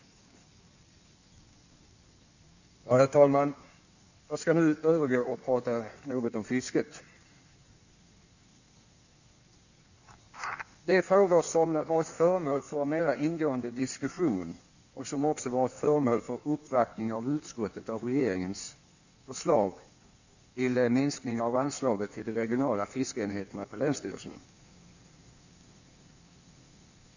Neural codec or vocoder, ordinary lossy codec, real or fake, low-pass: codec, 16 kHz in and 24 kHz out, 1.1 kbps, FireRedTTS-2 codec; none; fake; 7.2 kHz